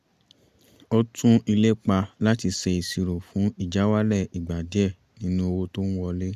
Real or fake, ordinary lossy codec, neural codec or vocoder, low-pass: real; Opus, 64 kbps; none; 14.4 kHz